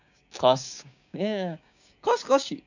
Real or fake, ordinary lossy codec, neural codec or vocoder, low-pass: fake; none; codec, 16 kHz, 6 kbps, DAC; 7.2 kHz